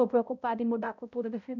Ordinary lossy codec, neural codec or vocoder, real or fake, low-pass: none; codec, 16 kHz, 0.5 kbps, X-Codec, HuBERT features, trained on balanced general audio; fake; 7.2 kHz